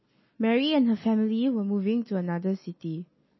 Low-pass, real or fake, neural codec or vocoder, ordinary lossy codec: 7.2 kHz; real; none; MP3, 24 kbps